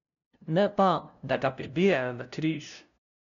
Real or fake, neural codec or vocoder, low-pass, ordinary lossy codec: fake; codec, 16 kHz, 0.5 kbps, FunCodec, trained on LibriTTS, 25 frames a second; 7.2 kHz; none